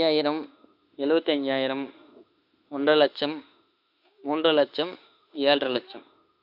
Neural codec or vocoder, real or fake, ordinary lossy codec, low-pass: autoencoder, 48 kHz, 32 numbers a frame, DAC-VAE, trained on Japanese speech; fake; none; 5.4 kHz